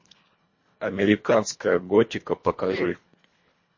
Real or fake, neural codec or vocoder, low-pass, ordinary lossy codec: fake; codec, 24 kHz, 1.5 kbps, HILCodec; 7.2 kHz; MP3, 32 kbps